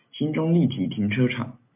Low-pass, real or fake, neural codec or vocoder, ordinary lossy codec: 3.6 kHz; real; none; MP3, 32 kbps